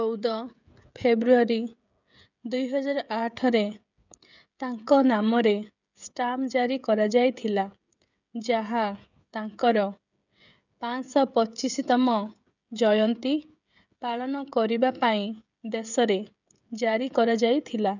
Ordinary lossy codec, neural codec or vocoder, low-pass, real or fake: none; codec, 16 kHz, 16 kbps, FreqCodec, smaller model; 7.2 kHz; fake